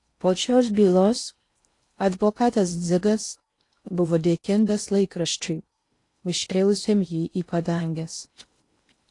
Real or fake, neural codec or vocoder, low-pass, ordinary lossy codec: fake; codec, 16 kHz in and 24 kHz out, 0.6 kbps, FocalCodec, streaming, 4096 codes; 10.8 kHz; AAC, 48 kbps